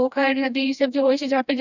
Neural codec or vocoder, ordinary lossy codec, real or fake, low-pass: codec, 16 kHz, 1 kbps, FreqCodec, smaller model; none; fake; 7.2 kHz